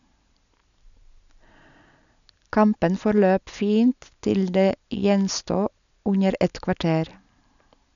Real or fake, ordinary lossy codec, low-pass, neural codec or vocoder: real; none; 7.2 kHz; none